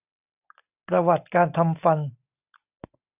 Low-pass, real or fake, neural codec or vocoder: 3.6 kHz; real; none